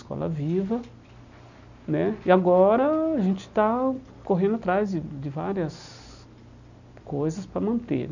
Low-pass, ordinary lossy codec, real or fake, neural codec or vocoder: 7.2 kHz; none; real; none